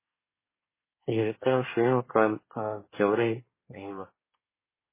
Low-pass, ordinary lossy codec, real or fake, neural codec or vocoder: 3.6 kHz; MP3, 16 kbps; fake; codec, 24 kHz, 1 kbps, SNAC